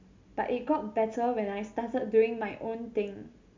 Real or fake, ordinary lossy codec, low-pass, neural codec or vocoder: real; MP3, 64 kbps; 7.2 kHz; none